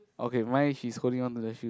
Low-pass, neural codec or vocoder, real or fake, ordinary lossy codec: none; none; real; none